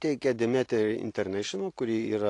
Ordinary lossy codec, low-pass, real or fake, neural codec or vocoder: AAC, 48 kbps; 10.8 kHz; real; none